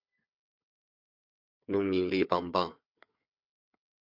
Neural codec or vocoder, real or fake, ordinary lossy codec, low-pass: codec, 16 kHz, 4 kbps, FunCodec, trained on Chinese and English, 50 frames a second; fake; MP3, 48 kbps; 5.4 kHz